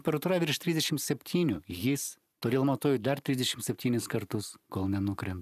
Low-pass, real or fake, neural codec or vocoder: 14.4 kHz; fake; vocoder, 44.1 kHz, 128 mel bands every 512 samples, BigVGAN v2